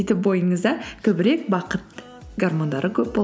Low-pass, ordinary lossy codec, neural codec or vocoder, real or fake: none; none; none; real